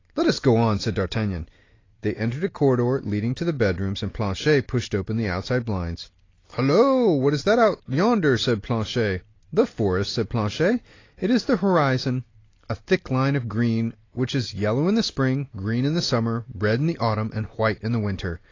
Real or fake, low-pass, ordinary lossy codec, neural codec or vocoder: real; 7.2 kHz; AAC, 32 kbps; none